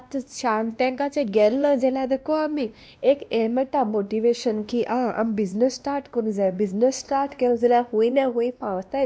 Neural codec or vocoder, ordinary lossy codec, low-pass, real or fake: codec, 16 kHz, 1 kbps, X-Codec, WavLM features, trained on Multilingual LibriSpeech; none; none; fake